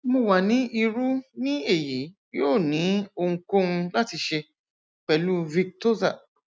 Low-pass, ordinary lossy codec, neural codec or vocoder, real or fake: none; none; none; real